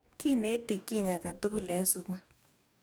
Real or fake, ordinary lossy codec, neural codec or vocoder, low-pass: fake; none; codec, 44.1 kHz, 2.6 kbps, DAC; none